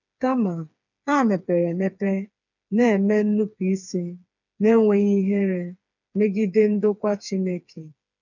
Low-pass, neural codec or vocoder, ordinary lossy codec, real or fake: 7.2 kHz; codec, 16 kHz, 4 kbps, FreqCodec, smaller model; none; fake